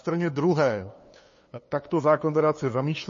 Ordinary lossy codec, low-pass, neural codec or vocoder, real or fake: MP3, 32 kbps; 7.2 kHz; codec, 16 kHz, 2 kbps, FunCodec, trained on LibriTTS, 25 frames a second; fake